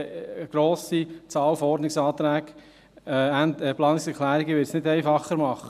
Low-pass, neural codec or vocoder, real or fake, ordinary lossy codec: 14.4 kHz; none; real; none